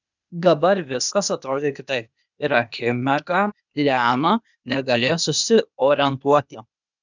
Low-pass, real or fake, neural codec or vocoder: 7.2 kHz; fake; codec, 16 kHz, 0.8 kbps, ZipCodec